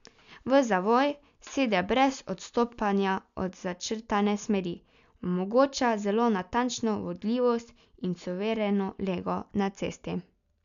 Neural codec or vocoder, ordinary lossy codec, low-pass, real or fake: none; none; 7.2 kHz; real